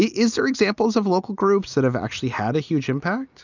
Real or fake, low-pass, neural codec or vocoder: real; 7.2 kHz; none